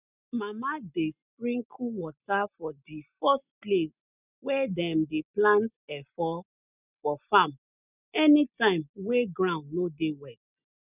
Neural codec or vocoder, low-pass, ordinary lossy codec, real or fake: none; 3.6 kHz; none; real